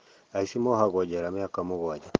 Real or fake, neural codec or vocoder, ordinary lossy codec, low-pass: real; none; Opus, 16 kbps; 7.2 kHz